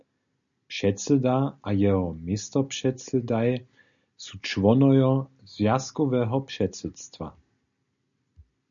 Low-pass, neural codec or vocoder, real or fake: 7.2 kHz; none; real